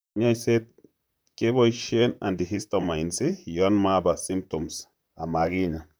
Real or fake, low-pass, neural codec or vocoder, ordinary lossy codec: fake; none; vocoder, 44.1 kHz, 128 mel bands, Pupu-Vocoder; none